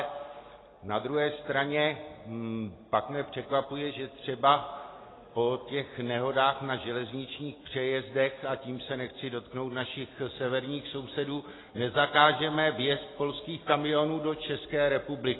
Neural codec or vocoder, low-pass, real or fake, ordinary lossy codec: none; 7.2 kHz; real; AAC, 16 kbps